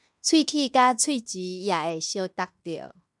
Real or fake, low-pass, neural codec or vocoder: fake; 10.8 kHz; codec, 16 kHz in and 24 kHz out, 0.9 kbps, LongCat-Audio-Codec, fine tuned four codebook decoder